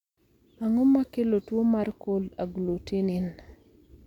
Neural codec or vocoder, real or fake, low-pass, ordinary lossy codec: none; real; 19.8 kHz; none